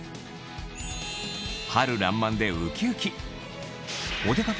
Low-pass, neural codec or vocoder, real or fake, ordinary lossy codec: none; none; real; none